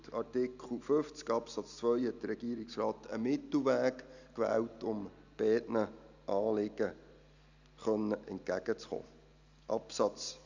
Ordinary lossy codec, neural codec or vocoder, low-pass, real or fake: none; none; 7.2 kHz; real